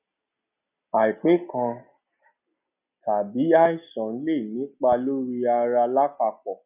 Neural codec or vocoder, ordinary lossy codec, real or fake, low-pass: none; none; real; 3.6 kHz